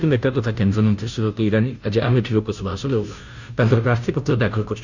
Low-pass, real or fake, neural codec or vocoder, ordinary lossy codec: 7.2 kHz; fake; codec, 16 kHz, 0.5 kbps, FunCodec, trained on Chinese and English, 25 frames a second; none